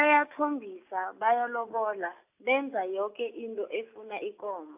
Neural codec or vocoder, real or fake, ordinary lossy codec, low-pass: none; real; AAC, 32 kbps; 3.6 kHz